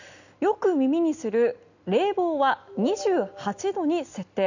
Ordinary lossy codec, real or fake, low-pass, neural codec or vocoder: none; real; 7.2 kHz; none